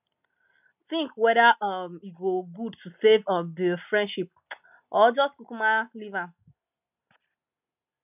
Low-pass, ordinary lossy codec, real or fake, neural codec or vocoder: 3.6 kHz; none; real; none